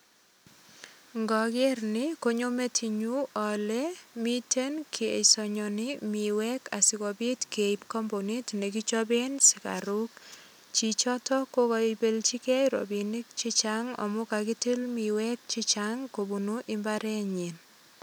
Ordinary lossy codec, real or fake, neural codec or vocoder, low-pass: none; real; none; none